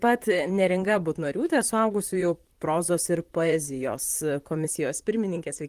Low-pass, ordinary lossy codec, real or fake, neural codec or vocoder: 14.4 kHz; Opus, 24 kbps; fake; vocoder, 44.1 kHz, 128 mel bands, Pupu-Vocoder